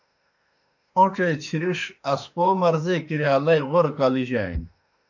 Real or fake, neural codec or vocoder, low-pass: fake; autoencoder, 48 kHz, 32 numbers a frame, DAC-VAE, trained on Japanese speech; 7.2 kHz